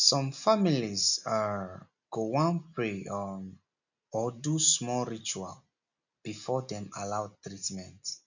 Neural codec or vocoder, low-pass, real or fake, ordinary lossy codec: none; 7.2 kHz; real; none